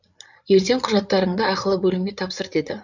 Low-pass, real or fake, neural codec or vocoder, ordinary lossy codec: 7.2 kHz; fake; codec, 16 kHz, 8 kbps, FreqCodec, larger model; none